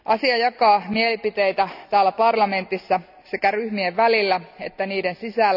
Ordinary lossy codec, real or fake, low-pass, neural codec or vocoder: none; real; 5.4 kHz; none